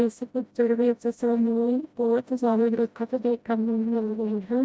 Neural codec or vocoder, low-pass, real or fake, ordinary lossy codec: codec, 16 kHz, 0.5 kbps, FreqCodec, smaller model; none; fake; none